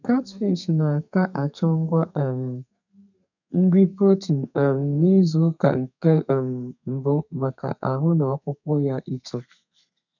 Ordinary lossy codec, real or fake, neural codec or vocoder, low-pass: none; fake; codec, 32 kHz, 1.9 kbps, SNAC; 7.2 kHz